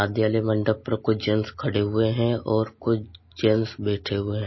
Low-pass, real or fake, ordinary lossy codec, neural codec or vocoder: 7.2 kHz; real; MP3, 24 kbps; none